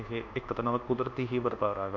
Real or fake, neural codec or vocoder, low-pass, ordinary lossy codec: fake; codec, 24 kHz, 1.2 kbps, DualCodec; 7.2 kHz; none